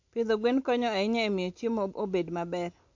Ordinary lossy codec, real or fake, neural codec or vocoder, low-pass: MP3, 48 kbps; real; none; 7.2 kHz